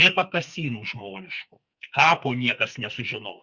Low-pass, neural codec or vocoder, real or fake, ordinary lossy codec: 7.2 kHz; codec, 44.1 kHz, 2.6 kbps, SNAC; fake; Opus, 64 kbps